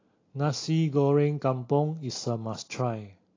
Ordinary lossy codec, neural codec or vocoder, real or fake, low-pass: AAC, 32 kbps; none; real; 7.2 kHz